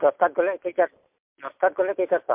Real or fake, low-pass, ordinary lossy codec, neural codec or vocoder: real; 3.6 kHz; MP3, 32 kbps; none